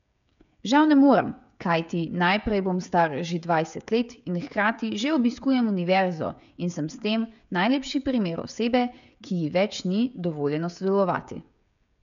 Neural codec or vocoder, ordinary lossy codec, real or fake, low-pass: codec, 16 kHz, 16 kbps, FreqCodec, smaller model; none; fake; 7.2 kHz